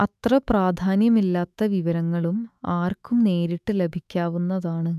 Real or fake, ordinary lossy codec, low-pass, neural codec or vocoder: fake; none; 14.4 kHz; autoencoder, 48 kHz, 128 numbers a frame, DAC-VAE, trained on Japanese speech